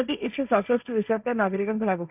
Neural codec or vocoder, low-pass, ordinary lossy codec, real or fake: codec, 16 kHz, 1.1 kbps, Voila-Tokenizer; 3.6 kHz; none; fake